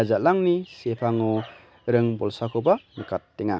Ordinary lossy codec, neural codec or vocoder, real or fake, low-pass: none; none; real; none